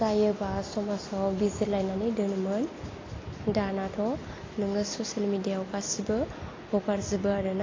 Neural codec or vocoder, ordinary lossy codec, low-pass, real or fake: none; AAC, 32 kbps; 7.2 kHz; real